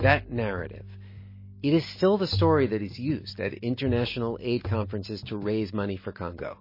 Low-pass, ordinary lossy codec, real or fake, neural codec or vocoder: 5.4 kHz; MP3, 24 kbps; real; none